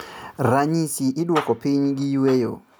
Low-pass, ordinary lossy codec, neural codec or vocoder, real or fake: none; none; none; real